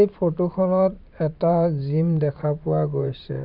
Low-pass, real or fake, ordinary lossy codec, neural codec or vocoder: 5.4 kHz; fake; none; vocoder, 44.1 kHz, 128 mel bands every 512 samples, BigVGAN v2